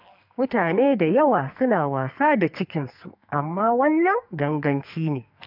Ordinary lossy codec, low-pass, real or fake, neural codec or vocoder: MP3, 48 kbps; 5.4 kHz; fake; codec, 44.1 kHz, 2.6 kbps, SNAC